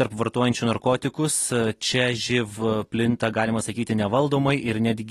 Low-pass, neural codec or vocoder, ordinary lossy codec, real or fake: 14.4 kHz; none; AAC, 32 kbps; real